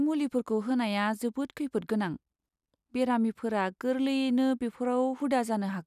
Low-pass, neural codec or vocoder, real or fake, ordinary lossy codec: 14.4 kHz; none; real; none